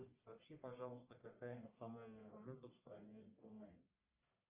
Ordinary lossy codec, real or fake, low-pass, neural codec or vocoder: Opus, 32 kbps; fake; 3.6 kHz; codec, 44.1 kHz, 1.7 kbps, Pupu-Codec